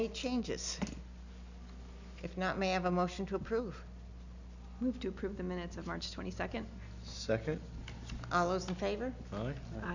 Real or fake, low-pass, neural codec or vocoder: real; 7.2 kHz; none